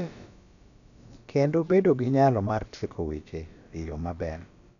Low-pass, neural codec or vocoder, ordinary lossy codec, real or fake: 7.2 kHz; codec, 16 kHz, about 1 kbps, DyCAST, with the encoder's durations; none; fake